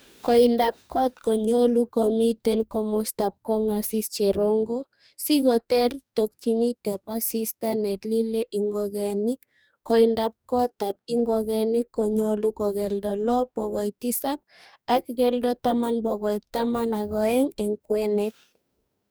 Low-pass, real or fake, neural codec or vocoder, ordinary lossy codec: none; fake; codec, 44.1 kHz, 2.6 kbps, DAC; none